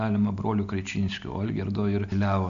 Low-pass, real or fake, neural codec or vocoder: 7.2 kHz; real; none